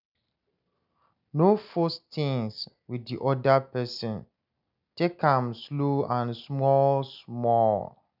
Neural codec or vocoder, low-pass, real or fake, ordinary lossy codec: none; 5.4 kHz; real; none